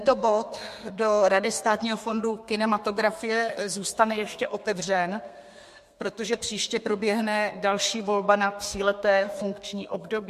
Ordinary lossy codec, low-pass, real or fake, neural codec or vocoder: MP3, 64 kbps; 14.4 kHz; fake; codec, 32 kHz, 1.9 kbps, SNAC